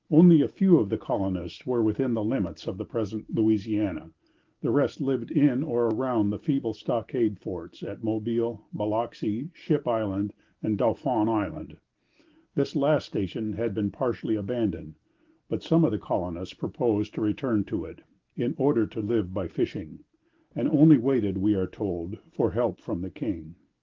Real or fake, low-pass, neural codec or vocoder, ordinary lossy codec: real; 7.2 kHz; none; Opus, 16 kbps